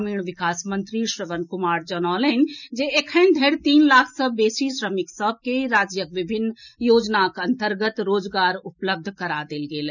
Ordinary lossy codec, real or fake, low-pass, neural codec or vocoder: none; real; 7.2 kHz; none